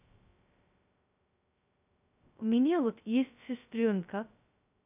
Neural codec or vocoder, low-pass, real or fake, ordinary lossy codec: codec, 16 kHz, 0.2 kbps, FocalCodec; 3.6 kHz; fake; none